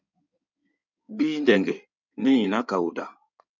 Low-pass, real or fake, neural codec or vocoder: 7.2 kHz; fake; codec, 16 kHz in and 24 kHz out, 2.2 kbps, FireRedTTS-2 codec